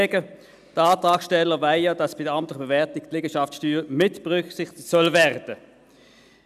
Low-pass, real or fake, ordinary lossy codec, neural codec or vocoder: 14.4 kHz; real; none; none